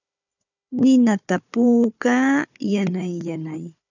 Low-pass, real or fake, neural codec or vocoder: 7.2 kHz; fake; codec, 16 kHz, 4 kbps, FunCodec, trained on Chinese and English, 50 frames a second